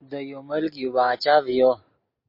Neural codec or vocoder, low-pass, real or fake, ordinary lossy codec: none; 5.4 kHz; real; MP3, 32 kbps